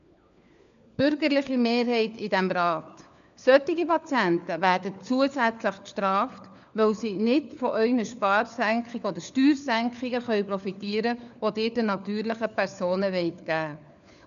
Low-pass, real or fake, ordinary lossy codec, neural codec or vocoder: 7.2 kHz; fake; none; codec, 16 kHz, 4 kbps, FunCodec, trained on LibriTTS, 50 frames a second